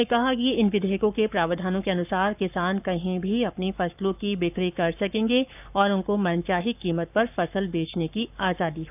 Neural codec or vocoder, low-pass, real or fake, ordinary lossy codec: codec, 44.1 kHz, 7.8 kbps, Pupu-Codec; 3.6 kHz; fake; none